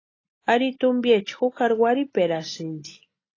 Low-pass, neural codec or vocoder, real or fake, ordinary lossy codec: 7.2 kHz; none; real; AAC, 32 kbps